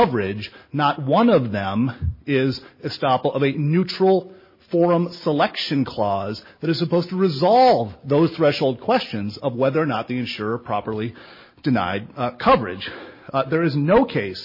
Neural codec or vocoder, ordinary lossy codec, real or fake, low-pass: none; MP3, 24 kbps; real; 5.4 kHz